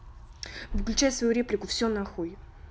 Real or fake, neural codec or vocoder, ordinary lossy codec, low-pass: real; none; none; none